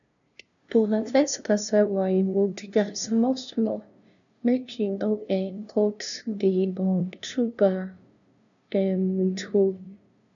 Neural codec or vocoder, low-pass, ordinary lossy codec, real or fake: codec, 16 kHz, 0.5 kbps, FunCodec, trained on LibriTTS, 25 frames a second; 7.2 kHz; none; fake